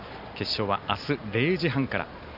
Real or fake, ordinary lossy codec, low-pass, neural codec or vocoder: real; none; 5.4 kHz; none